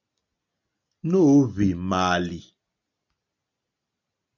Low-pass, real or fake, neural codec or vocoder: 7.2 kHz; real; none